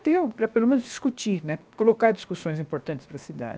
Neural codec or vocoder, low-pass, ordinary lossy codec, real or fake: codec, 16 kHz, 0.7 kbps, FocalCodec; none; none; fake